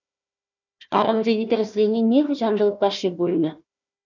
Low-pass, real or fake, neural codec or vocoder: 7.2 kHz; fake; codec, 16 kHz, 1 kbps, FunCodec, trained on Chinese and English, 50 frames a second